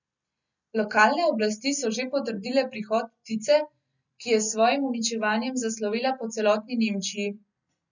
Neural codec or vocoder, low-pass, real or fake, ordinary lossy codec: none; 7.2 kHz; real; none